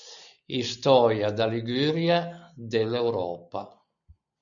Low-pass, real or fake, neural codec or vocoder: 7.2 kHz; real; none